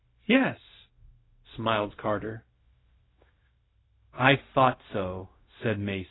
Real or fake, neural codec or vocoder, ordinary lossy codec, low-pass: fake; codec, 16 kHz, 0.4 kbps, LongCat-Audio-Codec; AAC, 16 kbps; 7.2 kHz